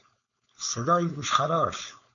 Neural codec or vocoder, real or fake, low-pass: codec, 16 kHz, 4.8 kbps, FACodec; fake; 7.2 kHz